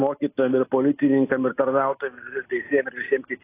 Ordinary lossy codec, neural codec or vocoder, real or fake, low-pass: AAC, 24 kbps; none; real; 3.6 kHz